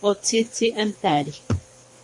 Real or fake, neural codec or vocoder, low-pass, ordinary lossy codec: fake; codec, 24 kHz, 3 kbps, HILCodec; 10.8 kHz; MP3, 48 kbps